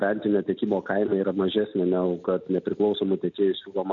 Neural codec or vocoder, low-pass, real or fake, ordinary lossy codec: none; 7.2 kHz; real; MP3, 96 kbps